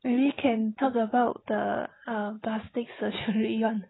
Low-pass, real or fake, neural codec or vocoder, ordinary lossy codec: 7.2 kHz; real; none; AAC, 16 kbps